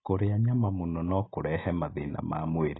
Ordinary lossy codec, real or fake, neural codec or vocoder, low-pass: AAC, 16 kbps; real; none; 7.2 kHz